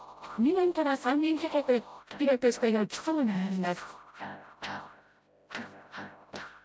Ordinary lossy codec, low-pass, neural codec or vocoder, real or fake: none; none; codec, 16 kHz, 0.5 kbps, FreqCodec, smaller model; fake